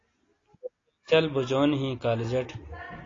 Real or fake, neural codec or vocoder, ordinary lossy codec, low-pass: real; none; AAC, 32 kbps; 7.2 kHz